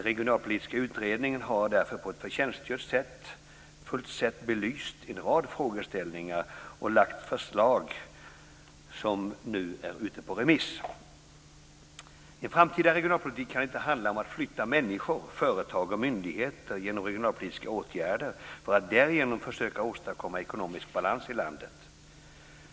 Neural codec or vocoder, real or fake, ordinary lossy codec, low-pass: none; real; none; none